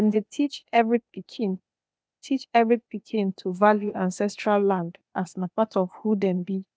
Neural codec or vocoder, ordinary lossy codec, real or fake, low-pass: codec, 16 kHz, 0.8 kbps, ZipCodec; none; fake; none